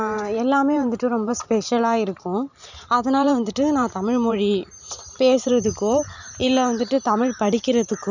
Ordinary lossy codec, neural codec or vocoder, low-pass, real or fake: none; vocoder, 44.1 kHz, 128 mel bands every 512 samples, BigVGAN v2; 7.2 kHz; fake